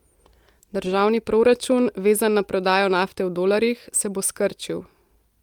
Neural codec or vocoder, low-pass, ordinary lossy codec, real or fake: none; 19.8 kHz; Opus, 32 kbps; real